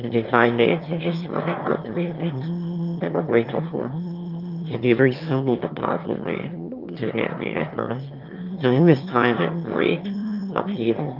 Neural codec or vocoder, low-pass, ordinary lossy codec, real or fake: autoencoder, 22.05 kHz, a latent of 192 numbers a frame, VITS, trained on one speaker; 5.4 kHz; Opus, 24 kbps; fake